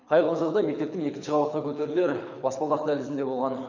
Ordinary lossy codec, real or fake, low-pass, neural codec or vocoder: none; fake; 7.2 kHz; codec, 24 kHz, 6 kbps, HILCodec